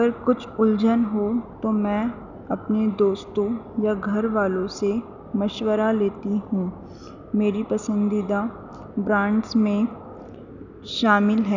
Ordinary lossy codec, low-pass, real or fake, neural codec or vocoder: none; 7.2 kHz; real; none